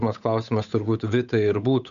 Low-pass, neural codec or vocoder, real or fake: 7.2 kHz; codec, 16 kHz, 8 kbps, FunCodec, trained on Chinese and English, 25 frames a second; fake